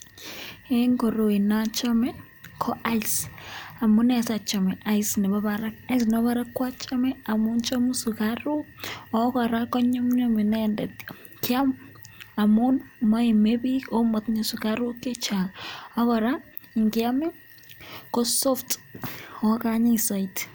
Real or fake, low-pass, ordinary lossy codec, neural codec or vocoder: real; none; none; none